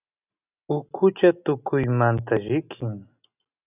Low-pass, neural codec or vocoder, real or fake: 3.6 kHz; none; real